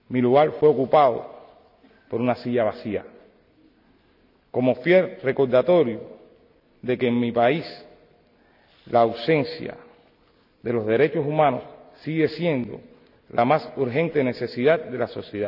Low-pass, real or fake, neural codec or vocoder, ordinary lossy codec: 5.4 kHz; real; none; none